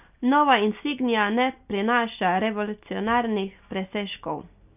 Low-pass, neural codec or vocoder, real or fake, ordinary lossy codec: 3.6 kHz; none; real; none